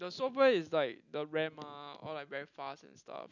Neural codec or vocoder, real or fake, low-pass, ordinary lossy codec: none; real; 7.2 kHz; none